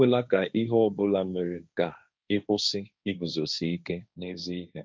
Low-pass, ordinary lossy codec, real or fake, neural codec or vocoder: none; none; fake; codec, 16 kHz, 1.1 kbps, Voila-Tokenizer